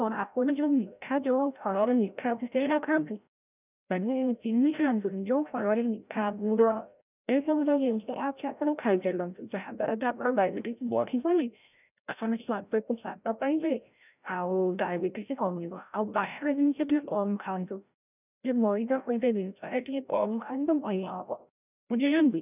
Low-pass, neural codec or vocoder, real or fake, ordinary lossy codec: 3.6 kHz; codec, 16 kHz, 0.5 kbps, FreqCodec, larger model; fake; none